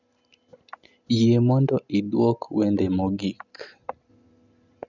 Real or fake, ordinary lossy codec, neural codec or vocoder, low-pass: real; none; none; 7.2 kHz